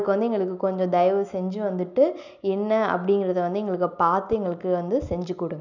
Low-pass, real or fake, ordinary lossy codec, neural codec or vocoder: 7.2 kHz; real; none; none